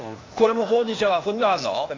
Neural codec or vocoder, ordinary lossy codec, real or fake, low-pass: codec, 16 kHz, 0.8 kbps, ZipCodec; AAC, 32 kbps; fake; 7.2 kHz